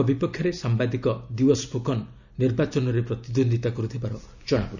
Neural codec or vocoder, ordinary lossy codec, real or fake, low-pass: none; none; real; 7.2 kHz